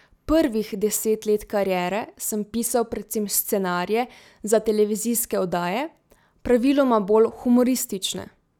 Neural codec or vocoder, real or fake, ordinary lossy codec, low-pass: none; real; none; 19.8 kHz